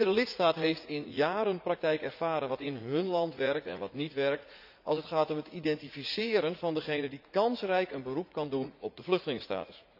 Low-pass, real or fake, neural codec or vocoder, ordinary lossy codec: 5.4 kHz; fake; vocoder, 44.1 kHz, 80 mel bands, Vocos; none